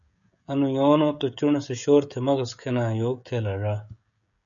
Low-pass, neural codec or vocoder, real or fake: 7.2 kHz; codec, 16 kHz, 16 kbps, FreqCodec, smaller model; fake